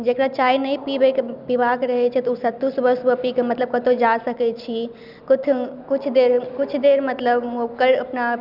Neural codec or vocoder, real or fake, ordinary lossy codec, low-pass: none; real; none; 5.4 kHz